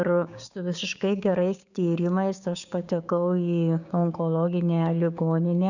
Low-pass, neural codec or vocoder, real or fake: 7.2 kHz; codec, 16 kHz, 6 kbps, DAC; fake